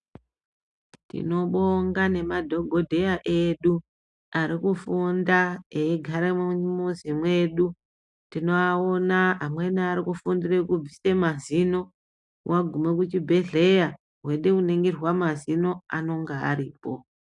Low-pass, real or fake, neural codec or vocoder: 10.8 kHz; real; none